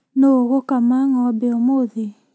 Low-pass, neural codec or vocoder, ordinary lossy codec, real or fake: none; none; none; real